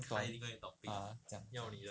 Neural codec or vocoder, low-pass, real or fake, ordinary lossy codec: none; none; real; none